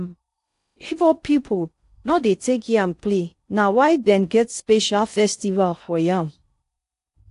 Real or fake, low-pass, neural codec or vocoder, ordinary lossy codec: fake; 10.8 kHz; codec, 16 kHz in and 24 kHz out, 0.6 kbps, FocalCodec, streaming, 4096 codes; AAC, 64 kbps